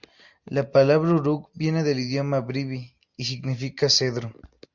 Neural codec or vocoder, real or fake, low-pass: none; real; 7.2 kHz